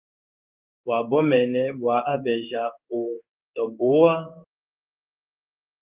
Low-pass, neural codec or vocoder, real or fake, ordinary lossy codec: 3.6 kHz; codec, 16 kHz in and 24 kHz out, 1 kbps, XY-Tokenizer; fake; Opus, 24 kbps